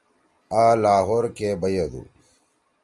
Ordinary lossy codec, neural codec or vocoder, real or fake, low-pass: Opus, 32 kbps; none; real; 10.8 kHz